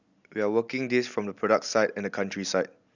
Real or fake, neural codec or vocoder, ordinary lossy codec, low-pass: real; none; none; 7.2 kHz